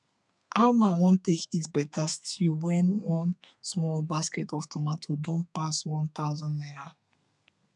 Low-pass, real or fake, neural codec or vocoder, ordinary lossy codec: 10.8 kHz; fake; codec, 32 kHz, 1.9 kbps, SNAC; none